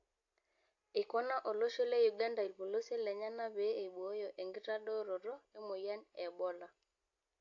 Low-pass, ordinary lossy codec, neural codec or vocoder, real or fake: 7.2 kHz; AAC, 48 kbps; none; real